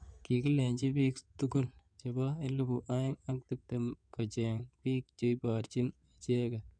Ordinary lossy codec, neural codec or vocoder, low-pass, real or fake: none; codec, 16 kHz in and 24 kHz out, 2.2 kbps, FireRedTTS-2 codec; 9.9 kHz; fake